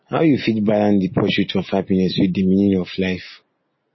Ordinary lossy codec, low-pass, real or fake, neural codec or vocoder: MP3, 24 kbps; 7.2 kHz; real; none